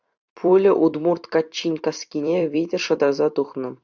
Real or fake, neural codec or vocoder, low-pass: fake; vocoder, 44.1 kHz, 128 mel bands every 256 samples, BigVGAN v2; 7.2 kHz